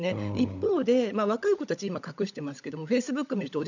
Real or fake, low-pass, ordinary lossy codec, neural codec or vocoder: fake; 7.2 kHz; none; codec, 16 kHz, 16 kbps, FunCodec, trained on LibriTTS, 50 frames a second